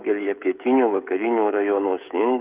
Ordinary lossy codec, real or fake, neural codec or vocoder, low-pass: Opus, 24 kbps; fake; codec, 16 kHz, 16 kbps, FreqCodec, smaller model; 3.6 kHz